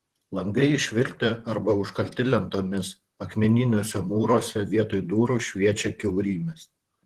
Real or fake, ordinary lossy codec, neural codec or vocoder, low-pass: fake; Opus, 16 kbps; vocoder, 44.1 kHz, 128 mel bands, Pupu-Vocoder; 14.4 kHz